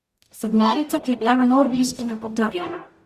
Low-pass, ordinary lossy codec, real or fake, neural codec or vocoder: 14.4 kHz; Opus, 64 kbps; fake; codec, 44.1 kHz, 0.9 kbps, DAC